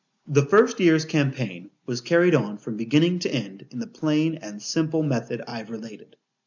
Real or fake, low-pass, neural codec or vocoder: real; 7.2 kHz; none